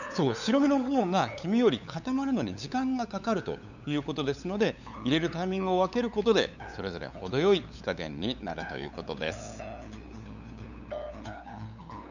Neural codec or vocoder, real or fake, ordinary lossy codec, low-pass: codec, 16 kHz, 8 kbps, FunCodec, trained on LibriTTS, 25 frames a second; fake; none; 7.2 kHz